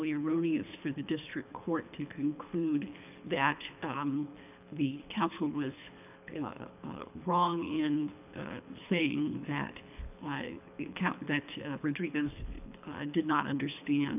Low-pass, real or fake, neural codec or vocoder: 3.6 kHz; fake; codec, 24 kHz, 3 kbps, HILCodec